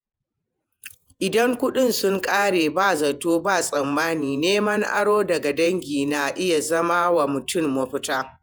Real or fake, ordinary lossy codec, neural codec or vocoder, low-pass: fake; none; vocoder, 48 kHz, 128 mel bands, Vocos; none